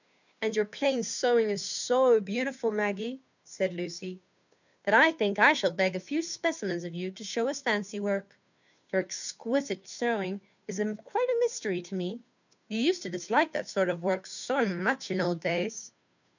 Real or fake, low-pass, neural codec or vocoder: fake; 7.2 kHz; codec, 16 kHz, 2 kbps, FunCodec, trained on Chinese and English, 25 frames a second